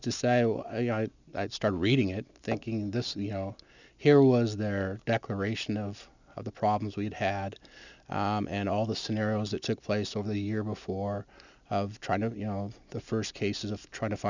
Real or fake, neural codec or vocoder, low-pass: real; none; 7.2 kHz